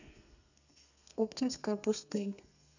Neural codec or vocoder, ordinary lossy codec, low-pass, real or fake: codec, 32 kHz, 1.9 kbps, SNAC; none; 7.2 kHz; fake